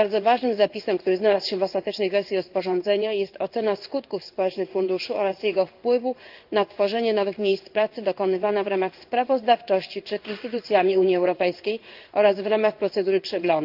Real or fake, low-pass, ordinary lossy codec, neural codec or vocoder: fake; 5.4 kHz; Opus, 24 kbps; codec, 16 kHz in and 24 kHz out, 1 kbps, XY-Tokenizer